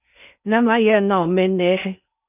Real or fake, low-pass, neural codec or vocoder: fake; 3.6 kHz; codec, 16 kHz in and 24 kHz out, 0.6 kbps, FocalCodec, streaming, 2048 codes